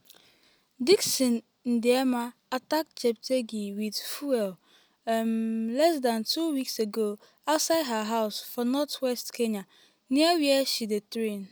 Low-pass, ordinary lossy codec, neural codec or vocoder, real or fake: none; none; none; real